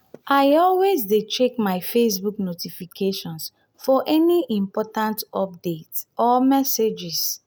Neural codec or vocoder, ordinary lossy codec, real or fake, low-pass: none; none; real; none